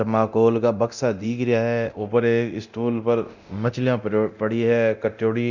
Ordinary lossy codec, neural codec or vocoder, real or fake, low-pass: none; codec, 24 kHz, 0.9 kbps, DualCodec; fake; 7.2 kHz